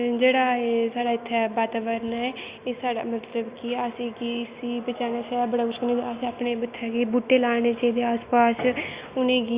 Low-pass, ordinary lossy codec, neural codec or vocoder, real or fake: 3.6 kHz; Opus, 64 kbps; none; real